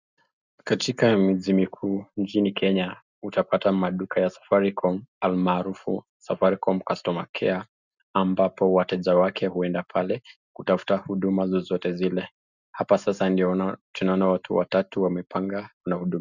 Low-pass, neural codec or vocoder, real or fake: 7.2 kHz; none; real